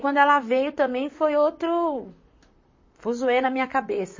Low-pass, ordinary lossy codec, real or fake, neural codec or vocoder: 7.2 kHz; MP3, 32 kbps; fake; vocoder, 44.1 kHz, 128 mel bands, Pupu-Vocoder